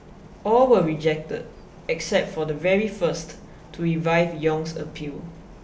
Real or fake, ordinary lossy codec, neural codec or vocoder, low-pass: real; none; none; none